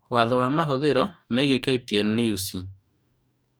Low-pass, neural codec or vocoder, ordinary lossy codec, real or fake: none; codec, 44.1 kHz, 2.6 kbps, DAC; none; fake